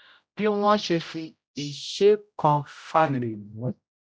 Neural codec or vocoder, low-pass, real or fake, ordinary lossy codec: codec, 16 kHz, 0.5 kbps, X-Codec, HuBERT features, trained on general audio; none; fake; none